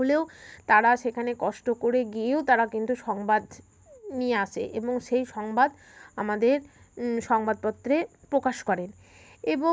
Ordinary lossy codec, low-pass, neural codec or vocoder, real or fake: none; none; none; real